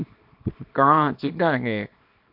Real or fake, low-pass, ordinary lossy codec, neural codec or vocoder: fake; 5.4 kHz; AAC, 48 kbps; codec, 24 kHz, 0.9 kbps, WavTokenizer, small release